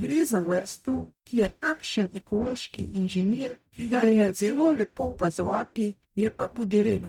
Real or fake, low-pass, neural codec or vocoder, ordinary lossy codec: fake; 19.8 kHz; codec, 44.1 kHz, 0.9 kbps, DAC; none